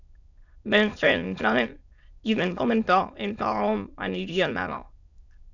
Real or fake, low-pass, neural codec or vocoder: fake; 7.2 kHz; autoencoder, 22.05 kHz, a latent of 192 numbers a frame, VITS, trained on many speakers